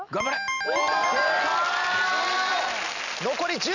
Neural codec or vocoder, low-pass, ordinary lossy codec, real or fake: none; 7.2 kHz; none; real